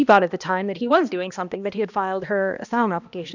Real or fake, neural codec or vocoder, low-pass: fake; codec, 16 kHz, 1 kbps, X-Codec, HuBERT features, trained on balanced general audio; 7.2 kHz